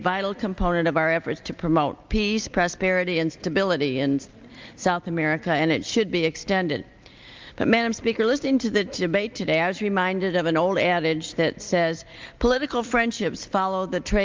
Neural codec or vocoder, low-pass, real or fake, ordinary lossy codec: none; 7.2 kHz; real; Opus, 32 kbps